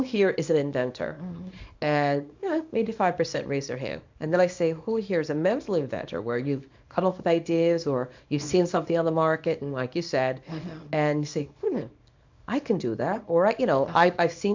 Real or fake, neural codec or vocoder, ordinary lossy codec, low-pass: fake; codec, 24 kHz, 0.9 kbps, WavTokenizer, small release; MP3, 64 kbps; 7.2 kHz